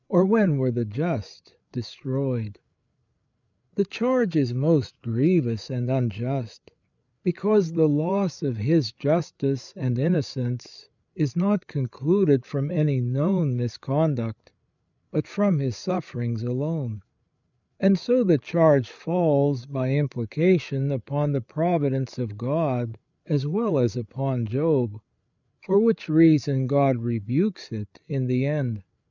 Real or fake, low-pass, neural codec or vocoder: fake; 7.2 kHz; codec, 16 kHz, 8 kbps, FreqCodec, larger model